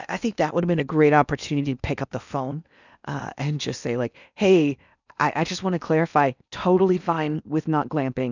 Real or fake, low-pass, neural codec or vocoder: fake; 7.2 kHz; codec, 16 kHz in and 24 kHz out, 0.8 kbps, FocalCodec, streaming, 65536 codes